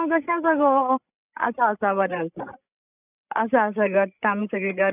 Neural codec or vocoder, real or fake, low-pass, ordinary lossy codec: codec, 16 kHz, 16 kbps, FreqCodec, larger model; fake; 3.6 kHz; none